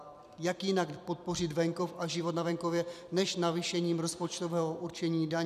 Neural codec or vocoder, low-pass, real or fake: vocoder, 44.1 kHz, 128 mel bands every 256 samples, BigVGAN v2; 14.4 kHz; fake